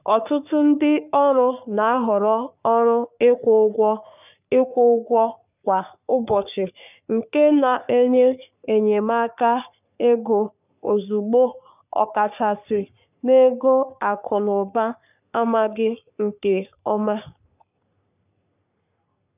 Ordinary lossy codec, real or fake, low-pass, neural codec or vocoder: none; fake; 3.6 kHz; codec, 44.1 kHz, 3.4 kbps, Pupu-Codec